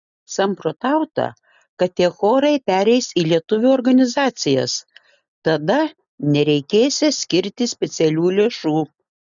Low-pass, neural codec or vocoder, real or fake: 7.2 kHz; none; real